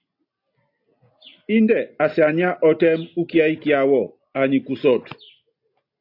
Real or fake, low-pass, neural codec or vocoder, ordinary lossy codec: real; 5.4 kHz; none; AAC, 32 kbps